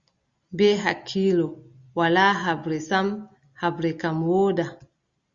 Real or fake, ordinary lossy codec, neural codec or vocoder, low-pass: real; Opus, 64 kbps; none; 7.2 kHz